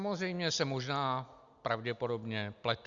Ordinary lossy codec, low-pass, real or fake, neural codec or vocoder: Opus, 64 kbps; 7.2 kHz; real; none